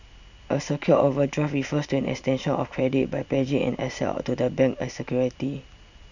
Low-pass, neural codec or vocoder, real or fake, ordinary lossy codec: 7.2 kHz; none; real; none